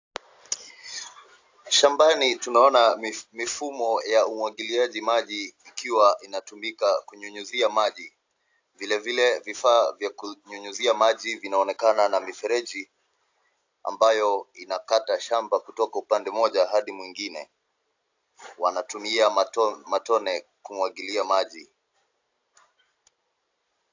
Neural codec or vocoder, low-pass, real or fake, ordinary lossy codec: none; 7.2 kHz; real; AAC, 48 kbps